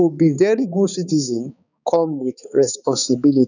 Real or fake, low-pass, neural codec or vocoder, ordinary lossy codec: fake; 7.2 kHz; codec, 16 kHz, 4 kbps, X-Codec, HuBERT features, trained on balanced general audio; none